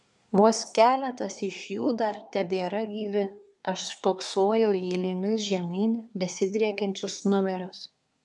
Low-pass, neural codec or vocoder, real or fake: 10.8 kHz; codec, 24 kHz, 1 kbps, SNAC; fake